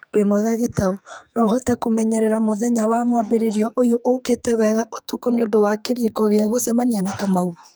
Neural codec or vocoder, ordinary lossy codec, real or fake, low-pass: codec, 44.1 kHz, 2.6 kbps, SNAC; none; fake; none